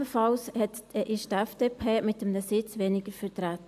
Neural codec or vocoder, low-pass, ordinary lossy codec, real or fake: none; 14.4 kHz; AAC, 96 kbps; real